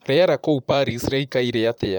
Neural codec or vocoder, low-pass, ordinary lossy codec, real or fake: vocoder, 44.1 kHz, 128 mel bands, Pupu-Vocoder; 19.8 kHz; none; fake